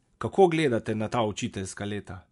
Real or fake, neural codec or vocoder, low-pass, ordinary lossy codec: real; none; 10.8 kHz; MP3, 64 kbps